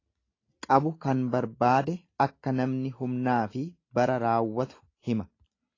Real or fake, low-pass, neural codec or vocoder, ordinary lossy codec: real; 7.2 kHz; none; AAC, 32 kbps